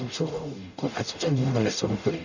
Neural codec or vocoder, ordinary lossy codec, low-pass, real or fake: codec, 44.1 kHz, 0.9 kbps, DAC; none; 7.2 kHz; fake